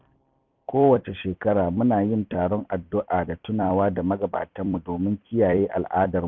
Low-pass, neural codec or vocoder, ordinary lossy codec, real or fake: 7.2 kHz; vocoder, 44.1 kHz, 128 mel bands every 256 samples, BigVGAN v2; none; fake